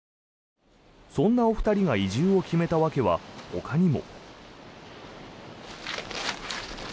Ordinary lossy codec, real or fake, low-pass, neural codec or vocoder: none; real; none; none